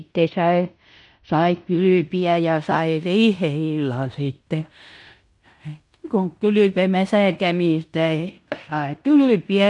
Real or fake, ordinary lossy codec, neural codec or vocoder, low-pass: fake; none; codec, 16 kHz in and 24 kHz out, 0.9 kbps, LongCat-Audio-Codec, fine tuned four codebook decoder; 10.8 kHz